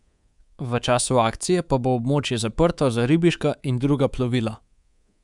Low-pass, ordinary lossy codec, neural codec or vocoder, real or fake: 10.8 kHz; none; codec, 24 kHz, 3.1 kbps, DualCodec; fake